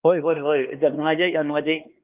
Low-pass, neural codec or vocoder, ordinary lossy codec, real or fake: 3.6 kHz; codec, 16 kHz, 2 kbps, X-Codec, HuBERT features, trained on LibriSpeech; Opus, 32 kbps; fake